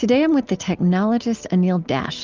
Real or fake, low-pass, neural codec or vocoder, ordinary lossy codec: real; 7.2 kHz; none; Opus, 32 kbps